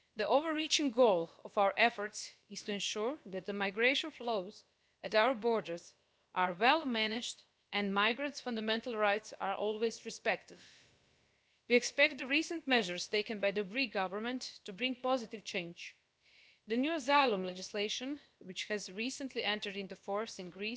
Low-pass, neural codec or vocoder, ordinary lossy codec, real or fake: none; codec, 16 kHz, about 1 kbps, DyCAST, with the encoder's durations; none; fake